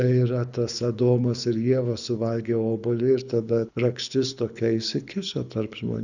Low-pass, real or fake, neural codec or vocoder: 7.2 kHz; fake; codec, 24 kHz, 6 kbps, HILCodec